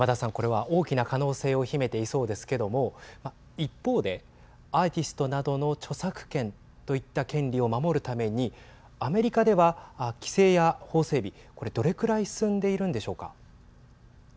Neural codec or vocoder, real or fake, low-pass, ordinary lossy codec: none; real; none; none